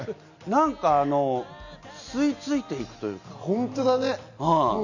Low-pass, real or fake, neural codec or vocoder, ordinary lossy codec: 7.2 kHz; real; none; none